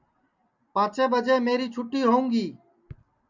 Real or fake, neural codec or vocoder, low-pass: real; none; 7.2 kHz